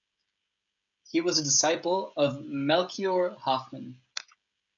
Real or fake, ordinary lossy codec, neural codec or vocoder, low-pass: fake; MP3, 64 kbps; codec, 16 kHz, 16 kbps, FreqCodec, smaller model; 7.2 kHz